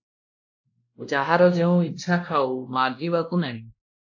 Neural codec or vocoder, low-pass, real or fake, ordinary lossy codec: codec, 16 kHz, 1 kbps, X-Codec, WavLM features, trained on Multilingual LibriSpeech; 7.2 kHz; fake; AAC, 48 kbps